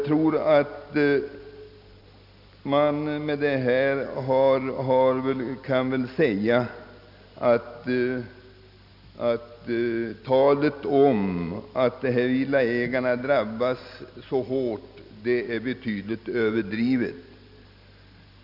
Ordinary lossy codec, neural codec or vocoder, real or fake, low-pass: none; none; real; 5.4 kHz